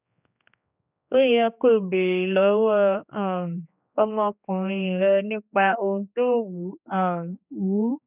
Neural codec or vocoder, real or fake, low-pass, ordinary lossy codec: codec, 16 kHz, 2 kbps, X-Codec, HuBERT features, trained on general audio; fake; 3.6 kHz; none